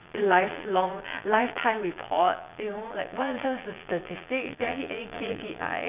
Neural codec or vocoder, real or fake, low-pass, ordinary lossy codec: vocoder, 22.05 kHz, 80 mel bands, Vocos; fake; 3.6 kHz; none